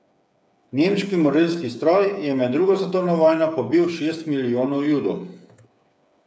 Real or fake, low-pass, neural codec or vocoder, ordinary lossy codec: fake; none; codec, 16 kHz, 8 kbps, FreqCodec, smaller model; none